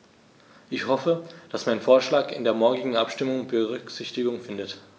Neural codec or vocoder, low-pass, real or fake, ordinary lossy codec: none; none; real; none